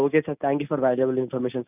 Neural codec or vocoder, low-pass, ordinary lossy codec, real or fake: none; 3.6 kHz; none; real